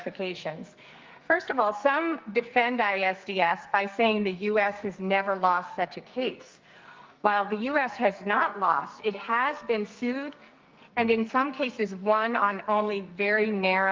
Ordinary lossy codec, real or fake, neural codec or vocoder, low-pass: Opus, 24 kbps; fake; codec, 44.1 kHz, 2.6 kbps, SNAC; 7.2 kHz